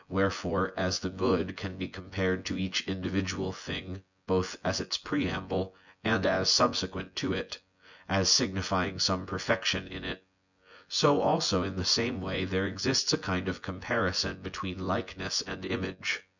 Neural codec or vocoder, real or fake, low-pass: vocoder, 24 kHz, 100 mel bands, Vocos; fake; 7.2 kHz